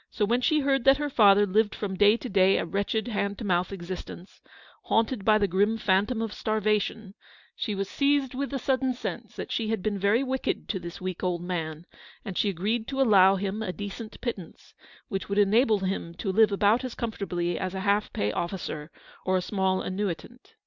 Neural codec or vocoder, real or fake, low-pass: none; real; 7.2 kHz